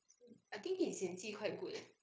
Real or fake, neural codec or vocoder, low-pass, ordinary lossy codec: fake; codec, 16 kHz, 0.9 kbps, LongCat-Audio-Codec; none; none